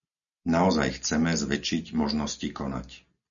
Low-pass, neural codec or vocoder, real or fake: 7.2 kHz; none; real